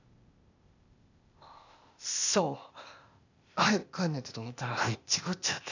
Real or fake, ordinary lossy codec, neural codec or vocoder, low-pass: fake; none; codec, 16 kHz, 0.8 kbps, ZipCodec; 7.2 kHz